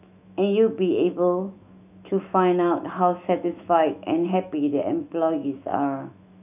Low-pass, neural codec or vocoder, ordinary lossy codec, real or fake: 3.6 kHz; autoencoder, 48 kHz, 128 numbers a frame, DAC-VAE, trained on Japanese speech; none; fake